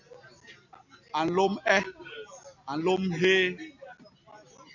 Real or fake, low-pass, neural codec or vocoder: real; 7.2 kHz; none